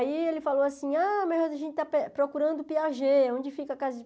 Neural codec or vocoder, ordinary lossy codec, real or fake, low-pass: none; none; real; none